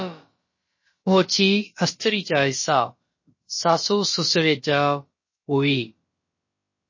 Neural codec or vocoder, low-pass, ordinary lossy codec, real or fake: codec, 16 kHz, about 1 kbps, DyCAST, with the encoder's durations; 7.2 kHz; MP3, 32 kbps; fake